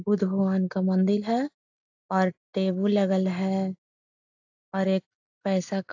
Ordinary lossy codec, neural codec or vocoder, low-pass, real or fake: AAC, 48 kbps; none; 7.2 kHz; real